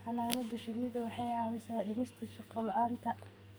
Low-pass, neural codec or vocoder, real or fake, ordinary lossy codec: none; codec, 44.1 kHz, 2.6 kbps, SNAC; fake; none